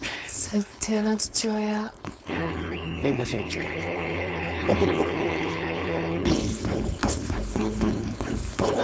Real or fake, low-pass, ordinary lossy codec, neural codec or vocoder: fake; none; none; codec, 16 kHz, 4.8 kbps, FACodec